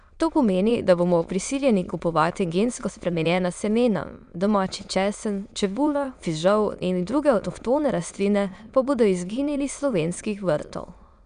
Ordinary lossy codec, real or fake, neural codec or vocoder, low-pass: none; fake; autoencoder, 22.05 kHz, a latent of 192 numbers a frame, VITS, trained on many speakers; 9.9 kHz